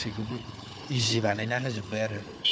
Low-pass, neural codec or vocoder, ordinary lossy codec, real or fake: none; codec, 16 kHz, 4 kbps, FunCodec, trained on Chinese and English, 50 frames a second; none; fake